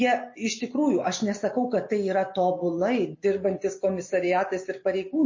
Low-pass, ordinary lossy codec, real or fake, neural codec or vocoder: 7.2 kHz; MP3, 32 kbps; real; none